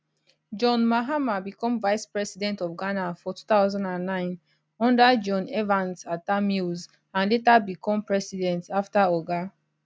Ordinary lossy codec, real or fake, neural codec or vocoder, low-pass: none; real; none; none